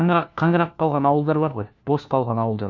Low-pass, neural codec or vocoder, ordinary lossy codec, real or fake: 7.2 kHz; codec, 16 kHz, 1 kbps, FunCodec, trained on LibriTTS, 50 frames a second; MP3, 64 kbps; fake